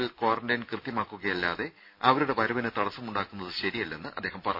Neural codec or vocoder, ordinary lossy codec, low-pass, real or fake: none; none; 5.4 kHz; real